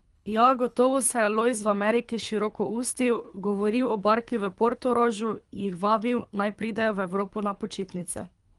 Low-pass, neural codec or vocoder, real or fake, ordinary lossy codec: 10.8 kHz; codec, 24 kHz, 3 kbps, HILCodec; fake; Opus, 32 kbps